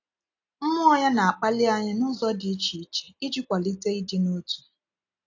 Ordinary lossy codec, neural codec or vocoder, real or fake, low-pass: AAC, 48 kbps; none; real; 7.2 kHz